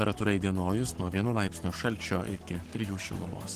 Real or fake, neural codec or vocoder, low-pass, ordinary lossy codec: fake; codec, 44.1 kHz, 7.8 kbps, Pupu-Codec; 14.4 kHz; Opus, 16 kbps